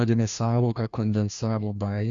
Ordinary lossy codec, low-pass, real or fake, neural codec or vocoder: Opus, 64 kbps; 7.2 kHz; fake; codec, 16 kHz, 1 kbps, FreqCodec, larger model